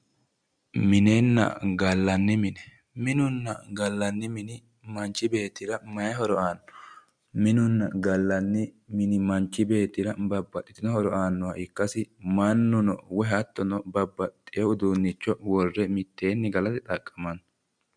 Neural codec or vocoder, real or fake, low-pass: none; real; 9.9 kHz